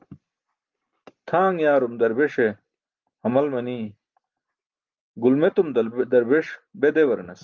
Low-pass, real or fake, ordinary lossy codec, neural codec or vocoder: 7.2 kHz; real; Opus, 24 kbps; none